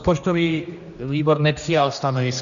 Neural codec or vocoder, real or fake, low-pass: codec, 16 kHz, 1 kbps, X-Codec, HuBERT features, trained on general audio; fake; 7.2 kHz